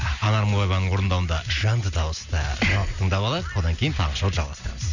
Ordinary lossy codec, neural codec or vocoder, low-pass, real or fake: none; none; 7.2 kHz; real